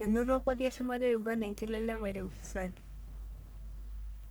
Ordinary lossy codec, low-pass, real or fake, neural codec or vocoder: none; none; fake; codec, 44.1 kHz, 1.7 kbps, Pupu-Codec